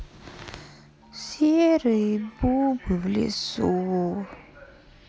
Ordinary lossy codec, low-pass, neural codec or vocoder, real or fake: none; none; none; real